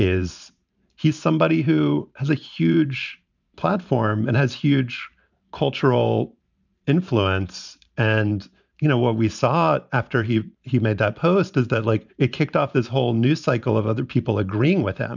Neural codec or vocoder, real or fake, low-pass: vocoder, 44.1 kHz, 128 mel bands every 512 samples, BigVGAN v2; fake; 7.2 kHz